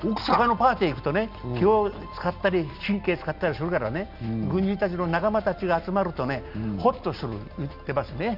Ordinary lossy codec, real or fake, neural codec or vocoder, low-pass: none; real; none; 5.4 kHz